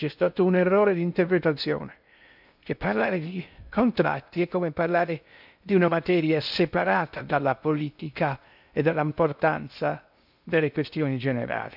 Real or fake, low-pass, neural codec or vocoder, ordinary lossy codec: fake; 5.4 kHz; codec, 16 kHz in and 24 kHz out, 0.6 kbps, FocalCodec, streaming, 2048 codes; none